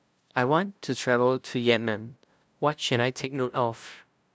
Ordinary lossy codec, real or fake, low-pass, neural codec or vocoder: none; fake; none; codec, 16 kHz, 0.5 kbps, FunCodec, trained on LibriTTS, 25 frames a second